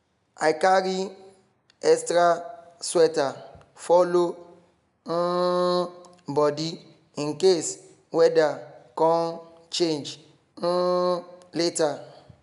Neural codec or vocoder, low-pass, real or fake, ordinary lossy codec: none; 10.8 kHz; real; none